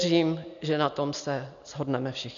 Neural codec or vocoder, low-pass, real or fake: none; 7.2 kHz; real